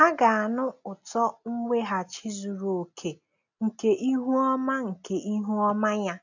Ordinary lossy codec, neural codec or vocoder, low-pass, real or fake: none; none; 7.2 kHz; real